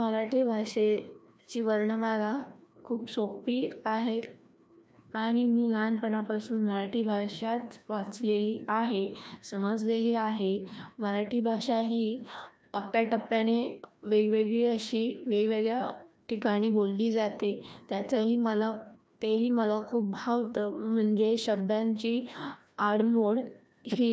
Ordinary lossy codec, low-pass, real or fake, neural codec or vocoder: none; none; fake; codec, 16 kHz, 1 kbps, FreqCodec, larger model